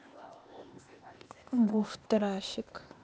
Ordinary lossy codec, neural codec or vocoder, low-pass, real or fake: none; codec, 16 kHz, 0.8 kbps, ZipCodec; none; fake